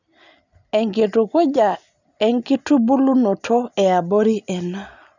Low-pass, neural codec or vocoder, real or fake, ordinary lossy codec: 7.2 kHz; none; real; none